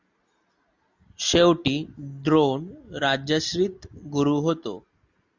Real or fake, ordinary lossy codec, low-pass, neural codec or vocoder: real; Opus, 64 kbps; 7.2 kHz; none